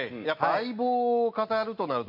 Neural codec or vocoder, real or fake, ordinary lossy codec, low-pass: none; real; MP3, 48 kbps; 5.4 kHz